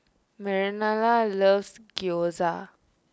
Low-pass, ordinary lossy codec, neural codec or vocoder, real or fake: none; none; none; real